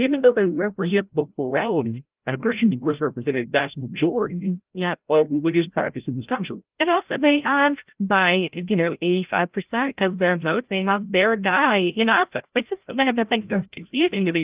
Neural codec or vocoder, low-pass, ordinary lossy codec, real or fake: codec, 16 kHz, 0.5 kbps, FreqCodec, larger model; 3.6 kHz; Opus, 32 kbps; fake